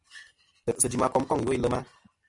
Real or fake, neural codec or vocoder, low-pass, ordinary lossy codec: real; none; 10.8 kHz; MP3, 96 kbps